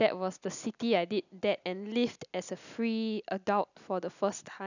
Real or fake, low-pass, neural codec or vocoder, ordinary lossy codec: real; 7.2 kHz; none; none